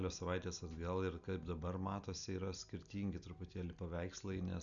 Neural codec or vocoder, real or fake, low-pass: none; real; 7.2 kHz